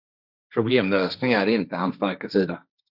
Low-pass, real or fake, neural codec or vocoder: 5.4 kHz; fake; codec, 16 kHz, 1.1 kbps, Voila-Tokenizer